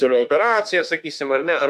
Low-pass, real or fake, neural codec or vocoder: 14.4 kHz; fake; autoencoder, 48 kHz, 32 numbers a frame, DAC-VAE, trained on Japanese speech